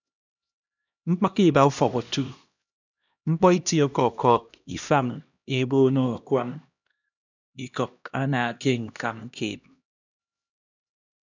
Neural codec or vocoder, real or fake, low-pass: codec, 16 kHz, 1 kbps, X-Codec, HuBERT features, trained on LibriSpeech; fake; 7.2 kHz